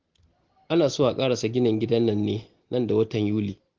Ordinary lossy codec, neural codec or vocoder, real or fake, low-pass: Opus, 16 kbps; none; real; 7.2 kHz